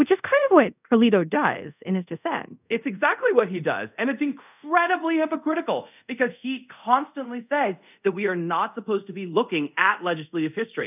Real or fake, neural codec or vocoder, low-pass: fake; codec, 24 kHz, 0.5 kbps, DualCodec; 3.6 kHz